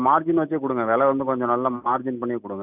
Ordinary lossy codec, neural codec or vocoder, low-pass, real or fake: none; none; 3.6 kHz; real